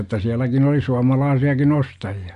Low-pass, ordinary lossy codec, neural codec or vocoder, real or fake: 10.8 kHz; MP3, 64 kbps; none; real